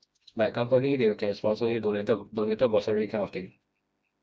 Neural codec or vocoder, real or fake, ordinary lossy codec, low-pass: codec, 16 kHz, 1 kbps, FreqCodec, smaller model; fake; none; none